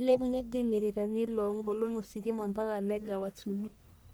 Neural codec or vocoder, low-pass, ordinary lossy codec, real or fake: codec, 44.1 kHz, 1.7 kbps, Pupu-Codec; none; none; fake